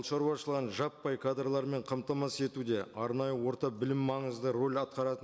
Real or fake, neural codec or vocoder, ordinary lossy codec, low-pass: real; none; none; none